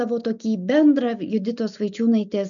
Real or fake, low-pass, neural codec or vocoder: real; 7.2 kHz; none